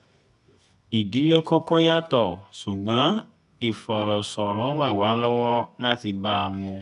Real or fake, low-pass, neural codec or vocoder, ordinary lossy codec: fake; 10.8 kHz; codec, 24 kHz, 0.9 kbps, WavTokenizer, medium music audio release; none